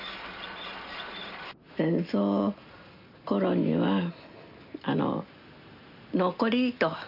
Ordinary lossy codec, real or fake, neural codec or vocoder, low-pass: none; real; none; 5.4 kHz